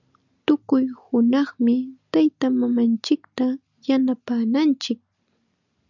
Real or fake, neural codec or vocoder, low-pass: real; none; 7.2 kHz